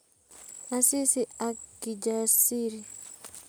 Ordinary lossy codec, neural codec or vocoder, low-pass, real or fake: none; none; none; real